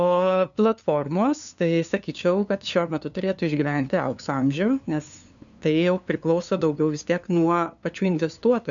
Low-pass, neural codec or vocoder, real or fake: 7.2 kHz; codec, 16 kHz, 2 kbps, FunCodec, trained on LibriTTS, 25 frames a second; fake